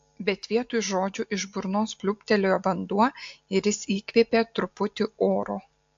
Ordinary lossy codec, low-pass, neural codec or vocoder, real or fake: AAC, 48 kbps; 7.2 kHz; none; real